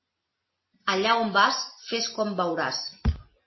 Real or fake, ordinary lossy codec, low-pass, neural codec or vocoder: real; MP3, 24 kbps; 7.2 kHz; none